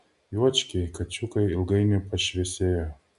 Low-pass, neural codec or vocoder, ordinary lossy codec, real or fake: 10.8 kHz; none; AAC, 96 kbps; real